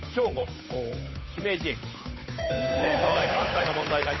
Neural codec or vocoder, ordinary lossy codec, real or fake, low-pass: codec, 16 kHz, 8 kbps, FunCodec, trained on Chinese and English, 25 frames a second; MP3, 24 kbps; fake; 7.2 kHz